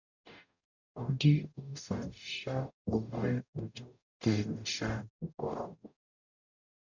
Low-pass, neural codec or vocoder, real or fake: 7.2 kHz; codec, 44.1 kHz, 0.9 kbps, DAC; fake